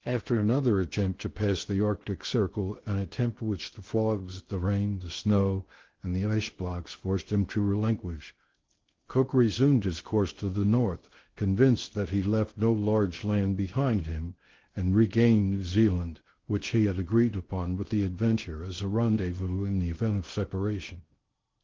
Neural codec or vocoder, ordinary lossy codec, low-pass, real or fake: codec, 16 kHz in and 24 kHz out, 0.6 kbps, FocalCodec, streaming, 2048 codes; Opus, 16 kbps; 7.2 kHz; fake